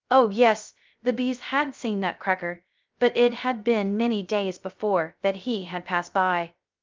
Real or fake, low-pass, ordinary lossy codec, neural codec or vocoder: fake; 7.2 kHz; Opus, 24 kbps; codec, 16 kHz, 0.3 kbps, FocalCodec